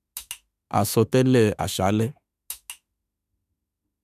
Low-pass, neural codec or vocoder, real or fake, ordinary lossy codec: 14.4 kHz; autoencoder, 48 kHz, 32 numbers a frame, DAC-VAE, trained on Japanese speech; fake; none